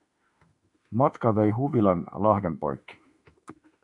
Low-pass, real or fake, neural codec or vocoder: 10.8 kHz; fake; autoencoder, 48 kHz, 32 numbers a frame, DAC-VAE, trained on Japanese speech